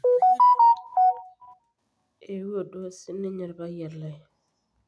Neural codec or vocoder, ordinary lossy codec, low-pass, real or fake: none; none; none; real